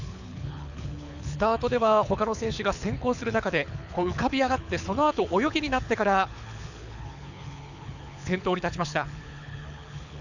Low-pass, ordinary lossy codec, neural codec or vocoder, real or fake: 7.2 kHz; none; codec, 24 kHz, 6 kbps, HILCodec; fake